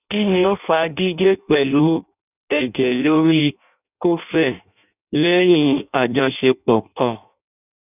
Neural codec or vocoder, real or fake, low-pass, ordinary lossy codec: codec, 16 kHz in and 24 kHz out, 0.6 kbps, FireRedTTS-2 codec; fake; 3.6 kHz; none